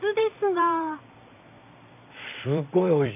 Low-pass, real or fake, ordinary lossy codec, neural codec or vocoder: 3.6 kHz; fake; none; vocoder, 44.1 kHz, 128 mel bands every 256 samples, BigVGAN v2